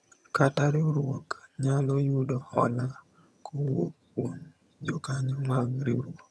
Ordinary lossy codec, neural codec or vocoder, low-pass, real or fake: none; vocoder, 22.05 kHz, 80 mel bands, HiFi-GAN; none; fake